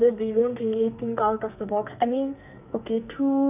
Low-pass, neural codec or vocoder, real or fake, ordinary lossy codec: 3.6 kHz; codec, 44.1 kHz, 2.6 kbps, SNAC; fake; none